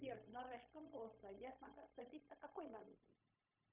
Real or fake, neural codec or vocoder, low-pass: fake; codec, 16 kHz, 0.4 kbps, LongCat-Audio-Codec; 3.6 kHz